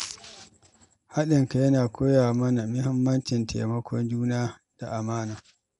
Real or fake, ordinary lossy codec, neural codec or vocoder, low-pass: real; none; none; 10.8 kHz